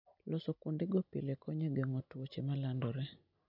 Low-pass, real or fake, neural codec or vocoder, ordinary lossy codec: 5.4 kHz; real; none; none